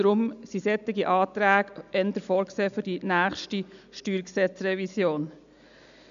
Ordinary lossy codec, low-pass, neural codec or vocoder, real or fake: none; 7.2 kHz; none; real